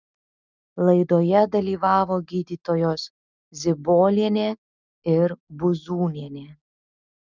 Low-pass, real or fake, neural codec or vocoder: 7.2 kHz; real; none